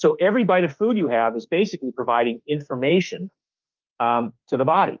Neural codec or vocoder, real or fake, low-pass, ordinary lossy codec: autoencoder, 48 kHz, 32 numbers a frame, DAC-VAE, trained on Japanese speech; fake; 7.2 kHz; Opus, 24 kbps